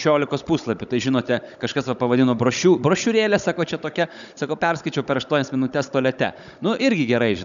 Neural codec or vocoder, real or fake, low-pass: codec, 16 kHz, 16 kbps, FunCodec, trained on Chinese and English, 50 frames a second; fake; 7.2 kHz